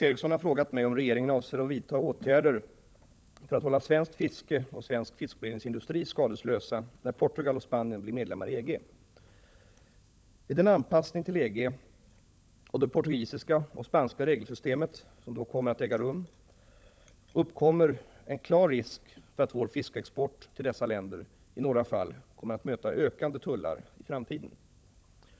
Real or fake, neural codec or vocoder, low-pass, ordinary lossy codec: fake; codec, 16 kHz, 16 kbps, FunCodec, trained on LibriTTS, 50 frames a second; none; none